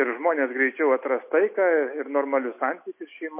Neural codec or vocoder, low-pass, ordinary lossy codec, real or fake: none; 3.6 kHz; MP3, 24 kbps; real